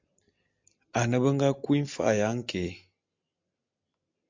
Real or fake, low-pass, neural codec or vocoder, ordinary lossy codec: real; 7.2 kHz; none; MP3, 64 kbps